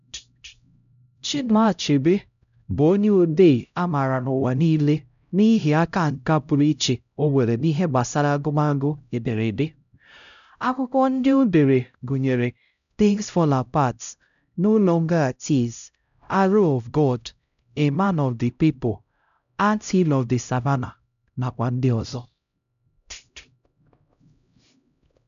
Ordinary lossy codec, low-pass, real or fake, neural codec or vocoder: none; 7.2 kHz; fake; codec, 16 kHz, 0.5 kbps, X-Codec, HuBERT features, trained on LibriSpeech